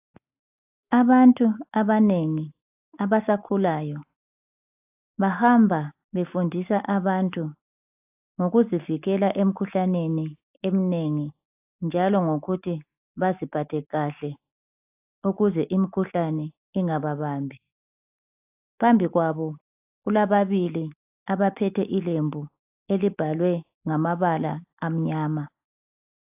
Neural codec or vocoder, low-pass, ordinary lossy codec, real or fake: none; 3.6 kHz; AAC, 32 kbps; real